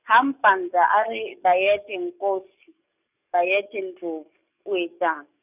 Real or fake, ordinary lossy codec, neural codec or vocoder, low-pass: fake; none; codec, 44.1 kHz, 7.8 kbps, DAC; 3.6 kHz